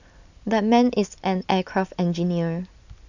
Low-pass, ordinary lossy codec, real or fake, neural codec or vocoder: 7.2 kHz; none; real; none